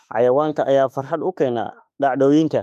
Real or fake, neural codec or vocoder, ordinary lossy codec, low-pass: fake; autoencoder, 48 kHz, 32 numbers a frame, DAC-VAE, trained on Japanese speech; none; 14.4 kHz